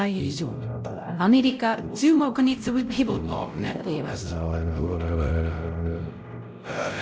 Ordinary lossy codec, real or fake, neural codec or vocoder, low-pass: none; fake; codec, 16 kHz, 0.5 kbps, X-Codec, WavLM features, trained on Multilingual LibriSpeech; none